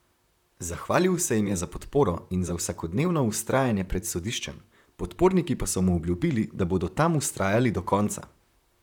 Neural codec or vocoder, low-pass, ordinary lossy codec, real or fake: vocoder, 44.1 kHz, 128 mel bands, Pupu-Vocoder; 19.8 kHz; none; fake